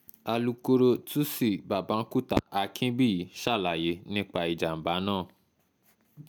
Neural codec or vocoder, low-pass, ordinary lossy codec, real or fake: none; none; none; real